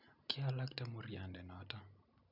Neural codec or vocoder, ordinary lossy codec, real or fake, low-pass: none; none; real; 5.4 kHz